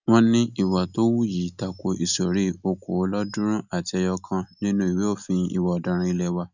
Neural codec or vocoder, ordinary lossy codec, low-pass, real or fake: none; none; 7.2 kHz; real